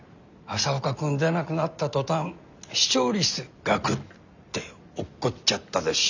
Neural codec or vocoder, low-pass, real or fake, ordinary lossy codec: none; 7.2 kHz; real; none